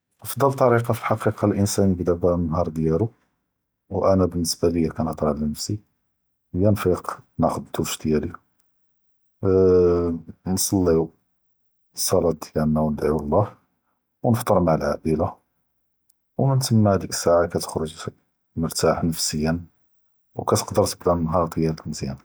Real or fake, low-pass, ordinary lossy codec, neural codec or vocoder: real; none; none; none